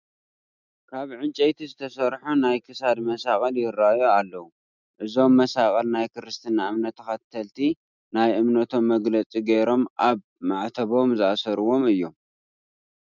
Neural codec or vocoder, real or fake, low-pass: none; real; 7.2 kHz